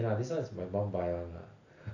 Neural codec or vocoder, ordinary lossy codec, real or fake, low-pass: none; none; real; 7.2 kHz